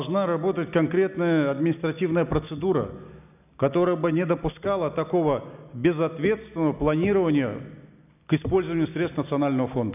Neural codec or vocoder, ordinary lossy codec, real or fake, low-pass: none; none; real; 3.6 kHz